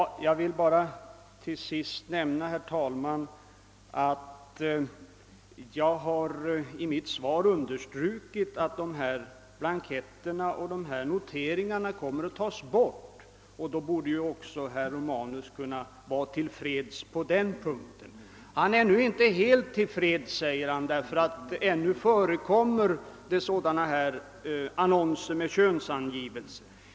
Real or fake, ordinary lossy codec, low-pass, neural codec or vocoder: real; none; none; none